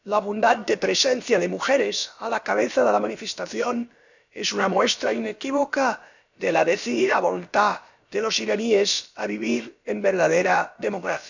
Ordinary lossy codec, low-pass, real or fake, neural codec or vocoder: none; 7.2 kHz; fake; codec, 16 kHz, about 1 kbps, DyCAST, with the encoder's durations